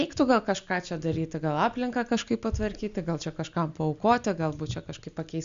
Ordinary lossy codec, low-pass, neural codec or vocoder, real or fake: MP3, 64 kbps; 7.2 kHz; none; real